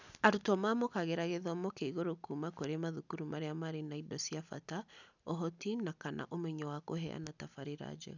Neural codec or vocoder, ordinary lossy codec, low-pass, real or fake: none; none; 7.2 kHz; real